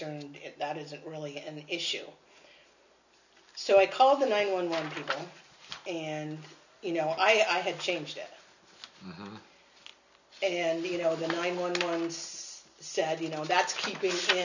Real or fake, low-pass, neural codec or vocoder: real; 7.2 kHz; none